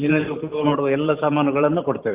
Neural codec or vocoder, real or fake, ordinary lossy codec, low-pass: vocoder, 44.1 kHz, 128 mel bands every 512 samples, BigVGAN v2; fake; Opus, 24 kbps; 3.6 kHz